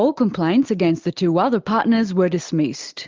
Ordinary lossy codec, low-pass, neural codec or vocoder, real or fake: Opus, 24 kbps; 7.2 kHz; none; real